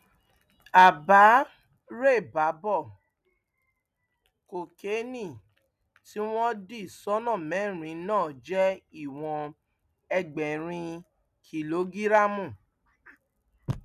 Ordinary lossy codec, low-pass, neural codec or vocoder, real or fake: none; 14.4 kHz; none; real